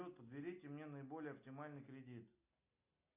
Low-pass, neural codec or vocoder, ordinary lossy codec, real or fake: 3.6 kHz; none; MP3, 32 kbps; real